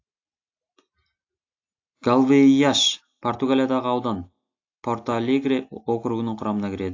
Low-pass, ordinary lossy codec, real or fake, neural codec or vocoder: 7.2 kHz; AAC, 48 kbps; real; none